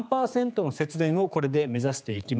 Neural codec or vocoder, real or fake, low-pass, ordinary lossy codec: codec, 16 kHz, 4 kbps, X-Codec, HuBERT features, trained on general audio; fake; none; none